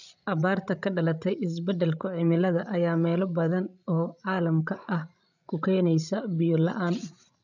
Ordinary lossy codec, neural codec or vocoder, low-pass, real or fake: none; codec, 16 kHz, 16 kbps, FreqCodec, larger model; 7.2 kHz; fake